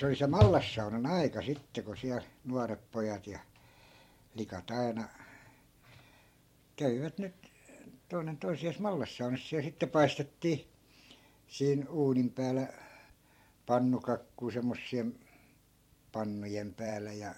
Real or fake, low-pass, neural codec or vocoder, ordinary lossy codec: fake; 19.8 kHz; vocoder, 44.1 kHz, 128 mel bands every 512 samples, BigVGAN v2; MP3, 64 kbps